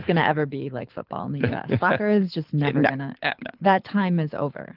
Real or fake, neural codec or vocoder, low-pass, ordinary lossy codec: fake; codec, 24 kHz, 6 kbps, HILCodec; 5.4 kHz; Opus, 32 kbps